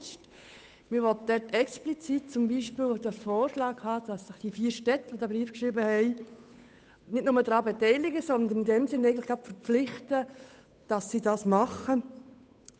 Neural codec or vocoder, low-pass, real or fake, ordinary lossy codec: codec, 16 kHz, 8 kbps, FunCodec, trained on Chinese and English, 25 frames a second; none; fake; none